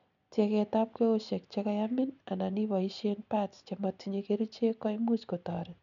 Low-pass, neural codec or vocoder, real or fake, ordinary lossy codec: 7.2 kHz; none; real; none